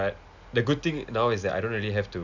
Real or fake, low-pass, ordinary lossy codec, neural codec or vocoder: real; 7.2 kHz; none; none